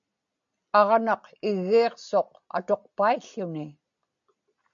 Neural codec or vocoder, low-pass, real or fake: none; 7.2 kHz; real